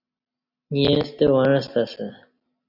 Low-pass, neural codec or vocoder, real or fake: 5.4 kHz; none; real